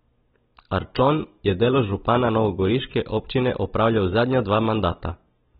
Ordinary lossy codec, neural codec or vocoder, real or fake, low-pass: AAC, 16 kbps; none; real; 19.8 kHz